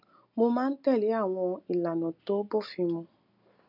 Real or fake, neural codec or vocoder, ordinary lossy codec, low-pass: real; none; none; 5.4 kHz